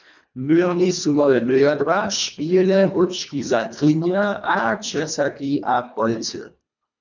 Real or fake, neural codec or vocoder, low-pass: fake; codec, 24 kHz, 1.5 kbps, HILCodec; 7.2 kHz